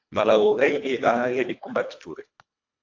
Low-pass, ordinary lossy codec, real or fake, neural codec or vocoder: 7.2 kHz; AAC, 48 kbps; fake; codec, 24 kHz, 1.5 kbps, HILCodec